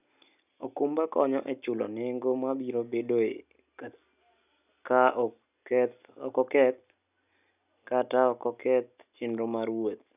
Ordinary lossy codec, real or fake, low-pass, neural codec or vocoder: none; real; 3.6 kHz; none